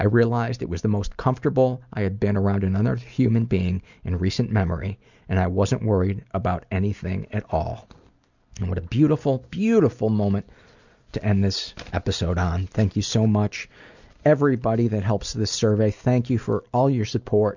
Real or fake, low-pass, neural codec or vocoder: real; 7.2 kHz; none